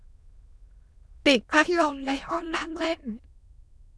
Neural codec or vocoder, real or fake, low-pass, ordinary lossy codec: autoencoder, 22.05 kHz, a latent of 192 numbers a frame, VITS, trained on many speakers; fake; none; none